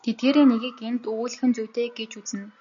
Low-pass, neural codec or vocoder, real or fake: 7.2 kHz; none; real